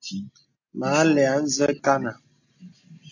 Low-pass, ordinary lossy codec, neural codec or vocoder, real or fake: 7.2 kHz; AAC, 48 kbps; none; real